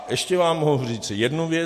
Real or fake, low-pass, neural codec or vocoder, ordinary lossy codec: real; 14.4 kHz; none; MP3, 64 kbps